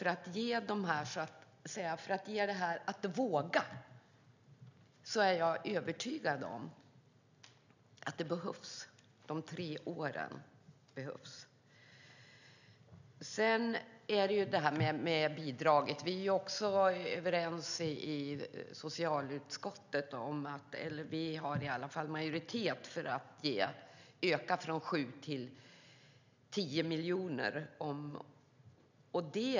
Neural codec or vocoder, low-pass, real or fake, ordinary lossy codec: none; 7.2 kHz; real; none